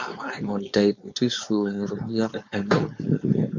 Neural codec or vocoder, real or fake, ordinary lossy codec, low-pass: codec, 16 kHz, 4.8 kbps, FACodec; fake; MP3, 64 kbps; 7.2 kHz